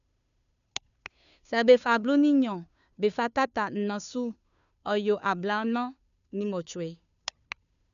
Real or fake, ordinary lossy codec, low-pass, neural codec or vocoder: fake; none; 7.2 kHz; codec, 16 kHz, 2 kbps, FunCodec, trained on Chinese and English, 25 frames a second